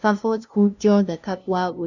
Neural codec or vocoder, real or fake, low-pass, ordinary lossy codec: codec, 16 kHz, 0.5 kbps, FunCodec, trained on LibriTTS, 25 frames a second; fake; 7.2 kHz; none